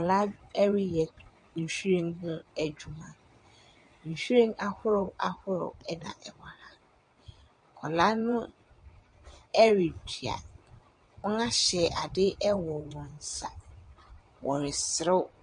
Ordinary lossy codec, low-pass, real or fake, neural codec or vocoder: MP3, 48 kbps; 9.9 kHz; fake; vocoder, 22.05 kHz, 80 mel bands, WaveNeXt